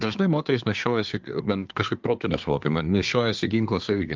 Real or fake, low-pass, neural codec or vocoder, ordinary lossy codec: fake; 7.2 kHz; codec, 24 kHz, 1 kbps, SNAC; Opus, 32 kbps